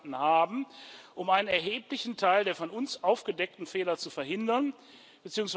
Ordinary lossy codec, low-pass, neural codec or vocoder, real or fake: none; none; none; real